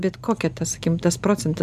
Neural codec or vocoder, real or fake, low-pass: none; real; 14.4 kHz